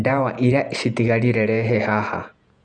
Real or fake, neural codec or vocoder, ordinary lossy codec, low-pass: fake; vocoder, 48 kHz, 128 mel bands, Vocos; none; 9.9 kHz